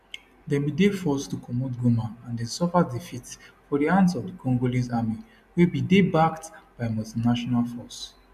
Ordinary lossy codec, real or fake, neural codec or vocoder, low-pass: none; real; none; 14.4 kHz